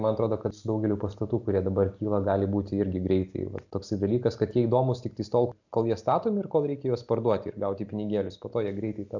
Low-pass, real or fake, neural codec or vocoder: 7.2 kHz; real; none